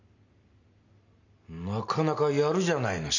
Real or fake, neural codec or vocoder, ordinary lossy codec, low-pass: real; none; none; 7.2 kHz